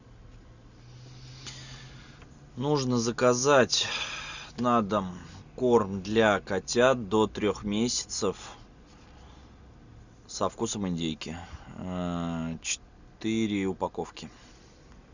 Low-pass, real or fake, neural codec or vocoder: 7.2 kHz; real; none